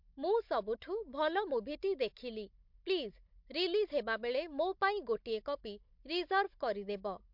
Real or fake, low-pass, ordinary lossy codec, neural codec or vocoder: fake; 5.4 kHz; MP3, 48 kbps; codec, 16 kHz, 16 kbps, FunCodec, trained on Chinese and English, 50 frames a second